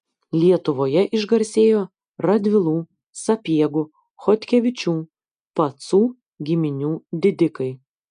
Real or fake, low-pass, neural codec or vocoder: real; 9.9 kHz; none